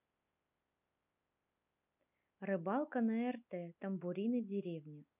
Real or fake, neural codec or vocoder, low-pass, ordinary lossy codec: real; none; 3.6 kHz; none